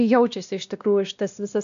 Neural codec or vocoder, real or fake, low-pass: codec, 16 kHz, 1 kbps, X-Codec, WavLM features, trained on Multilingual LibriSpeech; fake; 7.2 kHz